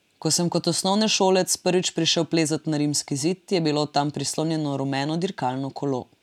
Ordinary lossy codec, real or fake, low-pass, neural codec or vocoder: none; real; 19.8 kHz; none